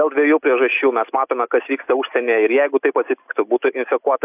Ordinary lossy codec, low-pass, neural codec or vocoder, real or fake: AAC, 32 kbps; 3.6 kHz; none; real